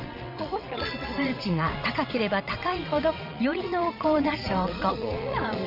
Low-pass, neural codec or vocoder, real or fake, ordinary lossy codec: 5.4 kHz; vocoder, 22.05 kHz, 80 mel bands, WaveNeXt; fake; none